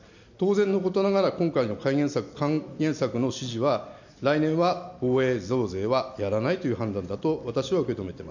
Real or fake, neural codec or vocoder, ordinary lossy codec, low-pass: real; none; none; 7.2 kHz